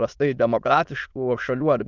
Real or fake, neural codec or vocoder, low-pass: fake; autoencoder, 22.05 kHz, a latent of 192 numbers a frame, VITS, trained on many speakers; 7.2 kHz